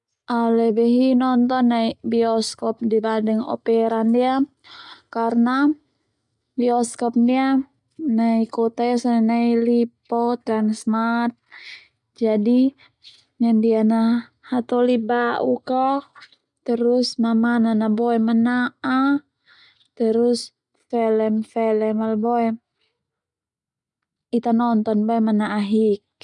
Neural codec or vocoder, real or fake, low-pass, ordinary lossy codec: none; real; 10.8 kHz; none